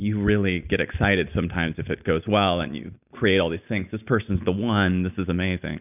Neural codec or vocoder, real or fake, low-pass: none; real; 3.6 kHz